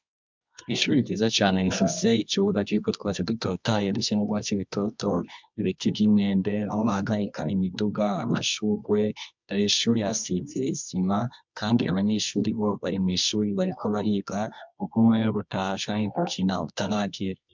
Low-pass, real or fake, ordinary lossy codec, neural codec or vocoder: 7.2 kHz; fake; MP3, 64 kbps; codec, 24 kHz, 0.9 kbps, WavTokenizer, medium music audio release